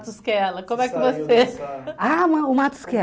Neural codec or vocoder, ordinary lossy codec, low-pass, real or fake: none; none; none; real